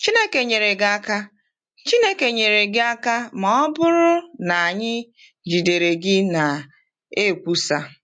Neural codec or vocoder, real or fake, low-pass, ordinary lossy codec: none; real; 9.9 kHz; MP3, 48 kbps